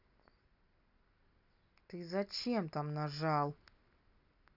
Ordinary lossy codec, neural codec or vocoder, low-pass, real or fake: none; none; 5.4 kHz; real